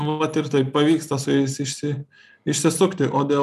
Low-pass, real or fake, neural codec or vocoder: 14.4 kHz; real; none